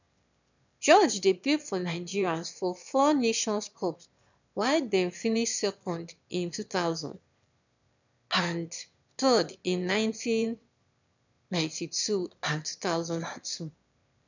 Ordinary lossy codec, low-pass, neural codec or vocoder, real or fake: none; 7.2 kHz; autoencoder, 22.05 kHz, a latent of 192 numbers a frame, VITS, trained on one speaker; fake